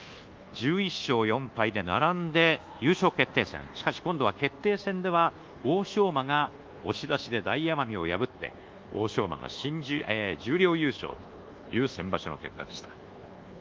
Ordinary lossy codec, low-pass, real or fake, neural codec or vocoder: Opus, 32 kbps; 7.2 kHz; fake; codec, 24 kHz, 1.2 kbps, DualCodec